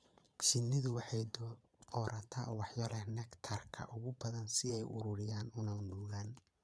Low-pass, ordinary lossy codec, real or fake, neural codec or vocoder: none; none; fake; vocoder, 22.05 kHz, 80 mel bands, Vocos